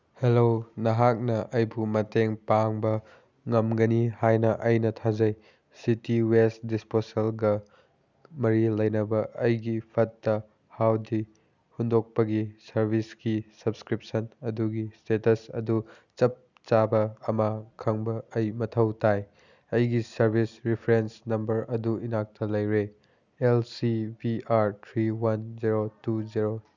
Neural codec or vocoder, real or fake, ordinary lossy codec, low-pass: none; real; none; 7.2 kHz